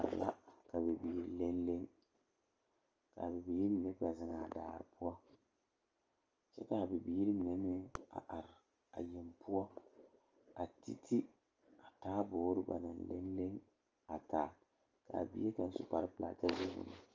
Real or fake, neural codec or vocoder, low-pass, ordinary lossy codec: real; none; 7.2 kHz; Opus, 24 kbps